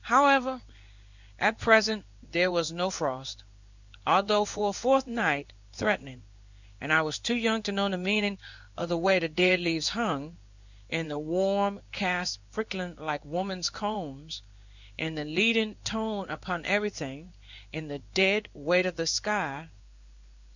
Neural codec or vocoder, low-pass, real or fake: codec, 16 kHz in and 24 kHz out, 1 kbps, XY-Tokenizer; 7.2 kHz; fake